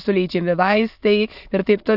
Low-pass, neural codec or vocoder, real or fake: 5.4 kHz; autoencoder, 22.05 kHz, a latent of 192 numbers a frame, VITS, trained on many speakers; fake